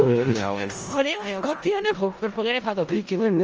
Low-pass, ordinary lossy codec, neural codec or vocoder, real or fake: 7.2 kHz; Opus, 24 kbps; codec, 16 kHz in and 24 kHz out, 0.4 kbps, LongCat-Audio-Codec, four codebook decoder; fake